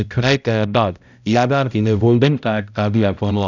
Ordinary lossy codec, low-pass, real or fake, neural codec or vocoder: none; 7.2 kHz; fake; codec, 16 kHz, 0.5 kbps, X-Codec, HuBERT features, trained on balanced general audio